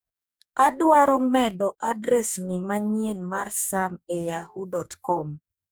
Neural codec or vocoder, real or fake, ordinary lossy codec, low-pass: codec, 44.1 kHz, 2.6 kbps, DAC; fake; none; none